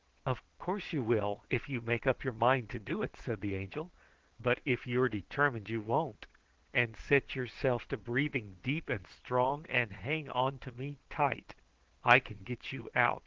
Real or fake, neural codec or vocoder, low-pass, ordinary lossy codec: fake; vocoder, 22.05 kHz, 80 mel bands, Vocos; 7.2 kHz; Opus, 16 kbps